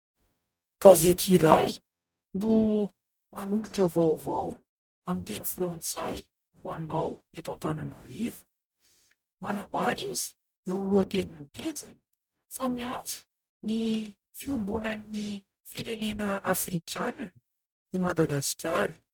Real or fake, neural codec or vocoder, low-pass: fake; codec, 44.1 kHz, 0.9 kbps, DAC; 19.8 kHz